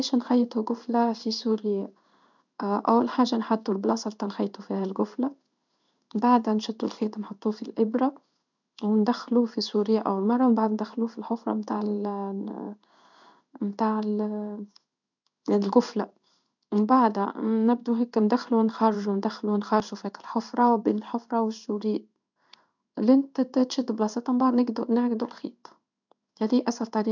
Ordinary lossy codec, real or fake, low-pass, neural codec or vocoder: none; fake; 7.2 kHz; codec, 16 kHz in and 24 kHz out, 1 kbps, XY-Tokenizer